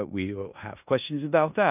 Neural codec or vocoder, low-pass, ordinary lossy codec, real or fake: codec, 16 kHz in and 24 kHz out, 0.9 kbps, LongCat-Audio-Codec, four codebook decoder; 3.6 kHz; none; fake